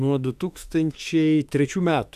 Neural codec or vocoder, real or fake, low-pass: autoencoder, 48 kHz, 32 numbers a frame, DAC-VAE, trained on Japanese speech; fake; 14.4 kHz